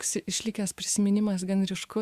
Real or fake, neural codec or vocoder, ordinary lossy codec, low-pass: fake; autoencoder, 48 kHz, 128 numbers a frame, DAC-VAE, trained on Japanese speech; Opus, 64 kbps; 14.4 kHz